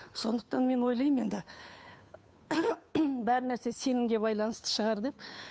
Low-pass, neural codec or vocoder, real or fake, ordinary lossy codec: none; codec, 16 kHz, 2 kbps, FunCodec, trained on Chinese and English, 25 frames a second; fake; none